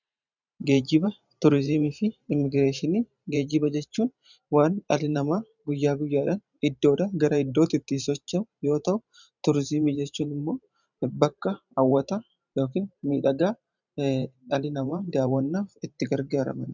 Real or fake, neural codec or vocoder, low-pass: fake; vocoder, 44.1 kHz, 128 mel bands every 512 samples, BigVGAN v2; 7.2 kHz